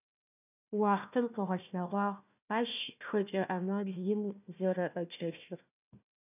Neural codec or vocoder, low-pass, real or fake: codec, 16 kHz, 1 kbps, FunCodec, trained on Chinese and English, 50 frames a second; 3.6 kHz; fake